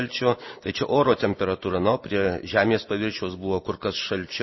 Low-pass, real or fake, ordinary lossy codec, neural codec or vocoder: 7.2 kHz; real; MP3, 24 kbps; none